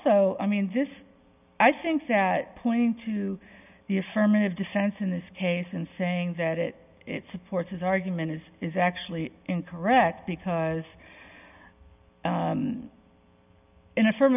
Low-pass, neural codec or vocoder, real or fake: 3.6 kHz; none; real